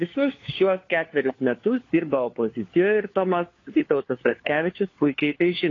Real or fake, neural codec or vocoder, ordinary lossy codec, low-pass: fake; codec, 16 kHz, 4 kbps, FunCodec, trained on LibriTTS, 50 frames a second; AAC, 32 kbps; 7.2 kHz